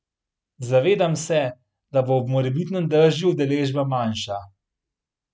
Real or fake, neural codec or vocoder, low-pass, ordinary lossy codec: real; none; none; none